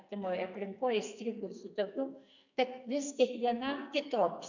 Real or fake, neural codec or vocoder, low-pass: fake; codec, 44.1 kHz, 2.6 kbps, SNAC; 7.2 kHz